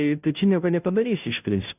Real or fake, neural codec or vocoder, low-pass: fake; codec, 16 kHz, 0.5 kbps, FunCodec, trained on Chinese and English, 25 frames a second; 3.6 kHz